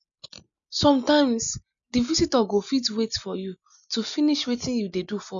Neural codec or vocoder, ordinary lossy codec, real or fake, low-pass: none; none; real; 7.2 kHz